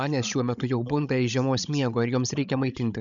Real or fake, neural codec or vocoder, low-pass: fake; codec, 16 kHz, 16 kbps, FreqCodec, larger model; 7.2 kHz